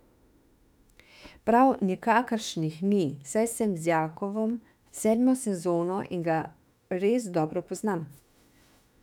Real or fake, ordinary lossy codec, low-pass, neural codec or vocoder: fake; none; 19.8 kHz; autoencoder, 48 kHz, 32 numbers a frame, DAC-VAE, trained on Japanese speech